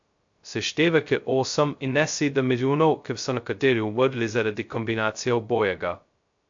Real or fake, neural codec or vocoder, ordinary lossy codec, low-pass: fake; codec, 16 kHz, 0.2 kbps, FocalCodec; MP3, 48 kbps; 7.2 kHz